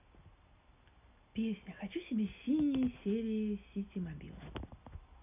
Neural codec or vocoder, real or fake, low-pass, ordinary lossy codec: none; real; 3.6 kHz; none